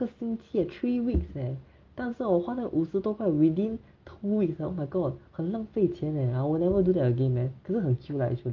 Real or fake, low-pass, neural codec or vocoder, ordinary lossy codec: real; 7.2 kHz; none; Opus, 24 kbps